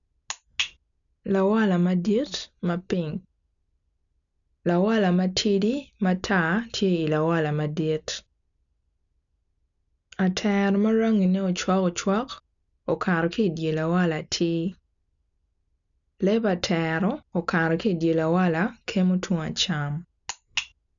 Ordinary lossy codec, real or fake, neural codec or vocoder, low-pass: none; real; none; 7.2 kHz